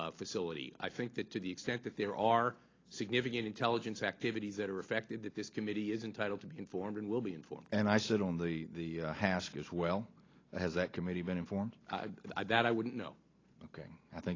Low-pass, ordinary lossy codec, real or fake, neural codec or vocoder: 7.2 kHz; AAC, 32 kbps; real; none